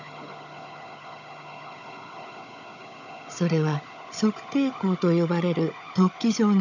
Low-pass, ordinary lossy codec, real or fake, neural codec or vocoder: 7.2 kHz; none; fake; codec, 16 kHz, 16 kbps, FunCodec, trained on Chinese and English, 50 frames a second